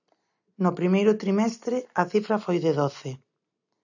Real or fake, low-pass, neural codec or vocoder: real; 7.2 kHz; none